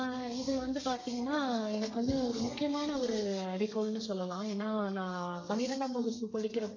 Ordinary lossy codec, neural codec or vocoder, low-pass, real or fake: AAC, 48 kbps; codec, 32 kHz, 1.9 kbps, SNAC; 7.2 kHz; fake